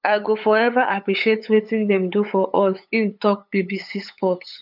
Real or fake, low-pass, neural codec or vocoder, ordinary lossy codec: fake; 5.4 kHz; codec, 16 kHz, 8 kbps, FunCodec, trained on LibriTTS, 25 frames a second; none